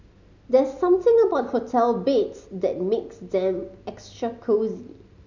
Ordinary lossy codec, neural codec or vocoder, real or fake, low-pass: MP3, 64 kbps; none; real; 7.2 kHz